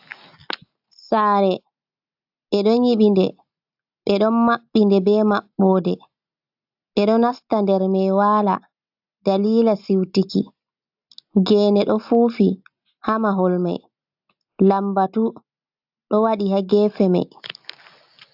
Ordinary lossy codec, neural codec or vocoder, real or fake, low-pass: AAC, 48 kbps; none; real; 5.4 kHz